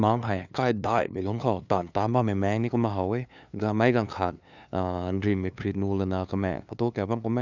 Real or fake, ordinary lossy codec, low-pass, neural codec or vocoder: fake; none; 7.2 kHz; codec, 16 kHz, 2 kbps, FunCodec, trained on LibriTTS, 25 frames a second